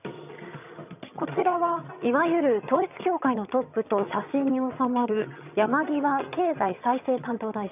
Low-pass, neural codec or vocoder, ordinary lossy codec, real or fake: 3.6 kHz; vocoder, 22.05 kHz, 80 mel bands, HiFi-GAN; none; fake